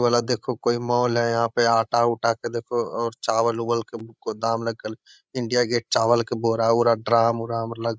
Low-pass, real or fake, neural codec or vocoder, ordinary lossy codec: none; fake; codec, 16 kHz, 16 kbps, FreqCodec, larger model; none